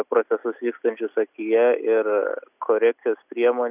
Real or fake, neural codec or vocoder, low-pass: real; none; 3.6 kHz